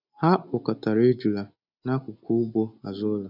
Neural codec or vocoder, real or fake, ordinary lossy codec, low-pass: none; real; none; 5.4 kHz